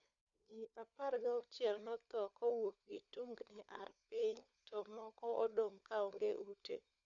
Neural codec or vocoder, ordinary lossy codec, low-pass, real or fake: codec, 16 kHz, 4 kbps, FunCodec, trained on LibriTTS, 50 frames a second; none; 7.2 kHz; fake